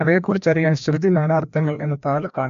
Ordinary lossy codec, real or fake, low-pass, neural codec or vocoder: MP3, 64 kbps; fake; 7.2 kHz; codec, 16 kHz, 1 kbps, FreqCodec, larger model